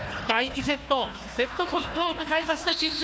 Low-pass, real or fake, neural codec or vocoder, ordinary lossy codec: none; fake; codec, 16 kHz, 1 kbps, FunCodec, trained on Chinese and English, 50 frames a second; none